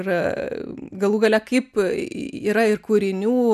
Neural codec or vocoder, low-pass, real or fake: none; 14.4 kHz; real